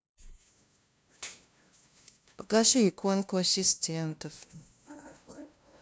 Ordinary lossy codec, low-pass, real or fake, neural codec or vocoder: none; none; fake; codec, 16 kHz, 0.5 kbps, FunCodec, trained on LibriTTS, 25 frames a second